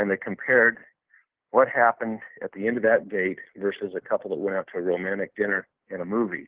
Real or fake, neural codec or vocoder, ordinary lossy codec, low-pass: real; none; Opus, 16 kbps; 3.6 kHz